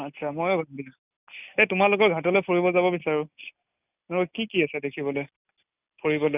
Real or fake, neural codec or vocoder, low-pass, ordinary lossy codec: real; none; 3.6 kHz; none